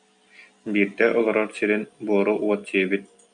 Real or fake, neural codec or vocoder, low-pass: real; none; 9.9 kHz